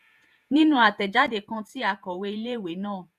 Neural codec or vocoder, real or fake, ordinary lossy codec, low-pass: vocoder, 48 kHz, 128 mel bands, Vocos; fake; none; 14.4 kHz